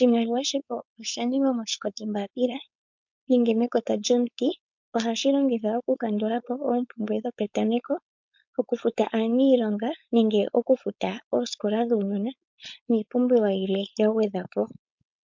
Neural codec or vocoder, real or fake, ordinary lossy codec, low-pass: codec, 16 kHz, 4.8 kbps, FACodec; fake; MP3, 64 kbps; 7.2 kHz